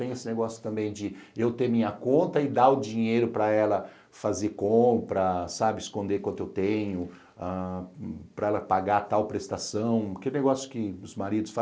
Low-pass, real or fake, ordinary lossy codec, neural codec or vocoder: none; real; none; none